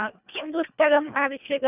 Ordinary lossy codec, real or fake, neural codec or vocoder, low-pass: none; fake; codec, 24 kHz, 1.5 kbps, HILCodec; 3.6 kHz